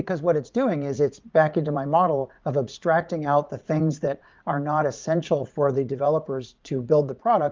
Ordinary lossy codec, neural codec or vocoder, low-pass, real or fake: Opus, 24 kbps; vocoder, 44.1 kHz, 80 mel bands, Vocos; 7.2 kHz; fake